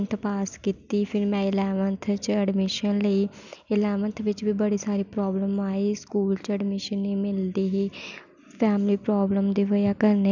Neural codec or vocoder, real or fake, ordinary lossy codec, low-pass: none; real; none; 7.2 kHz